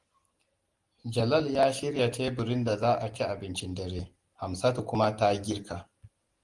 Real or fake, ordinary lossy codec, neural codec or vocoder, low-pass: real; Opus, 24 kbps; none; 10.8 kHz